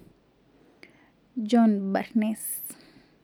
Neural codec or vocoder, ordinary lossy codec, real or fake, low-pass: none; none; real; none